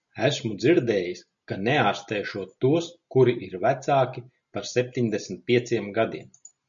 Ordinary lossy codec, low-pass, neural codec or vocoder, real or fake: MP3, 96 kbps; 7.2 kHz; none; real